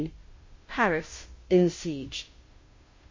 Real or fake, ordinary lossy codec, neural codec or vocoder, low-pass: fake; MP3, 32 kbps; codec, 16 kHz, 0.5 kbps, FunCodec, trained on Chinese and English, 25 frames a second; 7.2 kHz